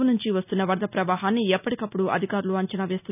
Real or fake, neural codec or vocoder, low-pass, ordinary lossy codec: real; none; 3.6 kHz; none